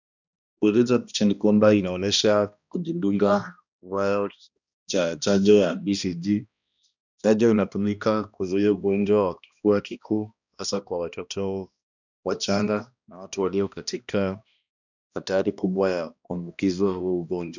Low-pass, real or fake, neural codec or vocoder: 7.2 kHz; fake; codec, 16 kHz, 1 kbps, X-Codec, HuBERT features, trained on balanced general audio